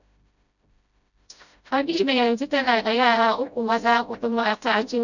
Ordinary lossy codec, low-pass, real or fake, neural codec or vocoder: none; 7.2 kHz; fake; codec, 16 kHz, 0.5 kbps, FreqCodec, smaller model